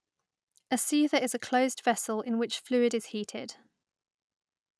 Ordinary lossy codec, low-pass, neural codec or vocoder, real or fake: none; none; none; real